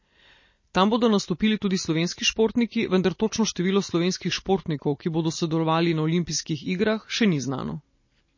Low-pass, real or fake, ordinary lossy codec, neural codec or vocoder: 7.2 kHz; real; MP3, 32 kbps; none